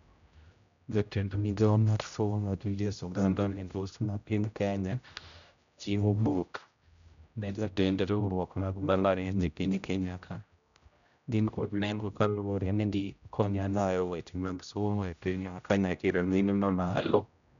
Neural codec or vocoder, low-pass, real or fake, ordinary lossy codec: codec, 16 kHz, 0.5 kbps, X-Codec, HuBERT features, trained on general audio; 7.2 kHz; fake; none